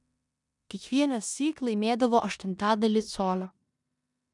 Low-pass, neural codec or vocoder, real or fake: 10.8 kHz; codec, 16 kHz in and 24 kHz out, 0.9 kbps, LongCat-Audio-Codec, four codebook decoder; fake